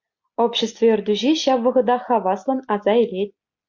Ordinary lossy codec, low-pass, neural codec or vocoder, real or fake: MP3, 48 kbps; 7.2 kHz; none; real